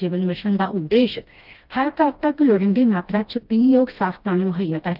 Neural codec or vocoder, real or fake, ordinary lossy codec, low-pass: codec, 16 kHz, 1 kbps, FreqCodec, smaller model; fake; Opus, 32 kbps; 5.4 kHz